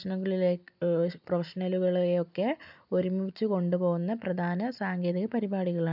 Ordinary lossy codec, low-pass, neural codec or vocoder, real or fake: none; 5.4 kHz; none; real